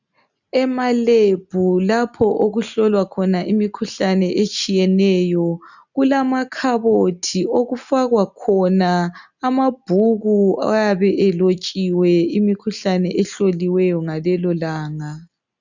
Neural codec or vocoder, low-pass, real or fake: none; 7.2 kHz; real